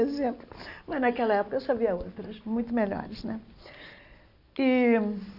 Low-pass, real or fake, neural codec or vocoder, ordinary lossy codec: 5.4 kHz; real; none; none